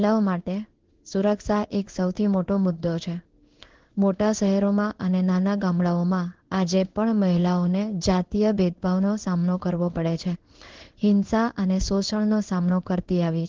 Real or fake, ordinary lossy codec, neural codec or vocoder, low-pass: fake; Opus, 16 kbps; codec, 16 kHz in and 24 kHz out, 1 kbps, XY-Tokenizer; 7.2 kHz